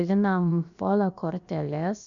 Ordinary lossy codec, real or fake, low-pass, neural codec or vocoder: MP3, 96 kbps; fake; 7.2 kHz; codec, 16 kHz, about 1 kbps, DyCAST, with the encoder's durations